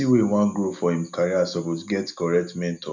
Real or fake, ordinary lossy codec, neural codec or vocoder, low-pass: real; none; none; 7.2 kHz